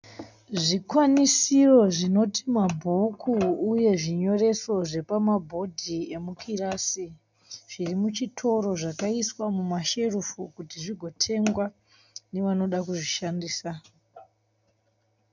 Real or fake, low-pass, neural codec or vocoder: real; 7.2 kHz; none